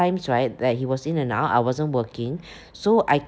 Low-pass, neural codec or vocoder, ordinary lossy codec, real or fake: none; none; none; real